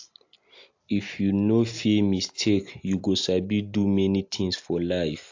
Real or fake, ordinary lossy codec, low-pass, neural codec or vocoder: real; AAC, 48 kbps; 7.2 kHz; none